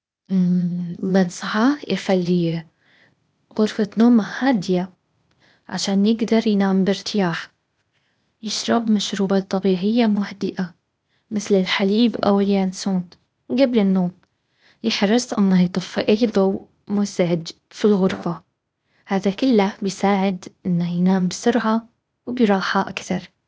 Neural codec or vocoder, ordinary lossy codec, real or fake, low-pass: codec, 16 kHz, 0.8 kbps, ZipCodec; none; fake; none